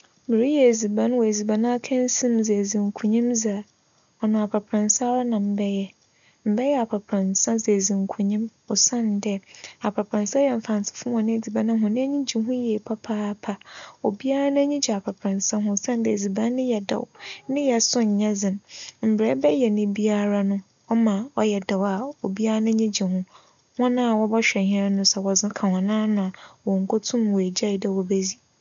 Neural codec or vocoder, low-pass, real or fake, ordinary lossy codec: none; 7.2 kHz; real; none